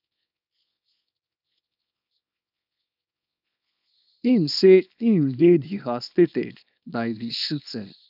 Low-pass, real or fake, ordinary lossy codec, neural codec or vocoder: 5.4 kHz; fake; none; codec, 24 kHz, 0.9 kbps, WavTokenizer, small release